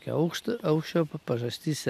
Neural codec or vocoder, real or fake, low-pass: none; real; 14.4 kHz